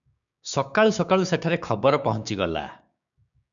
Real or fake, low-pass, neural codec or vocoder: fake; 7.2 kHz; codec, 16 kHz, 6 kbps, DAC